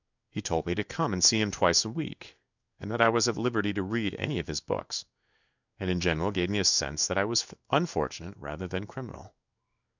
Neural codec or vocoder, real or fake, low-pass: codec, 16 kHz in and 24 kHz out, 1 kbps, XY-Tokenizer; fake; 7.2 kHz